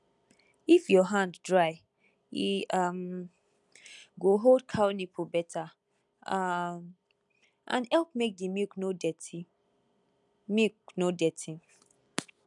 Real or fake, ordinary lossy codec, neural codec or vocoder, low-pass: real; none; none; 10.8 kHz